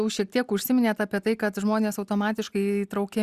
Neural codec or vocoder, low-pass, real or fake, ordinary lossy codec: none; 14.4 kHz; real; Opus, 64 kbps